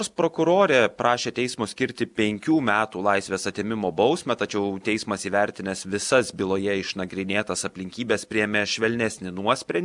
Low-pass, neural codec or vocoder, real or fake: 10.8 kHz; none; real